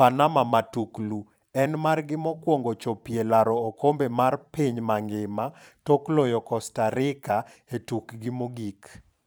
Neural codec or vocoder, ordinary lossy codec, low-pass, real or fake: vocoder, 44.1 kHz, 128 mel bands every 512 samples, BigVGAN v2; none; none; fake